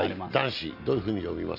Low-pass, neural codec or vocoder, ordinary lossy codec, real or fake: 5.4 kHz; none; none; real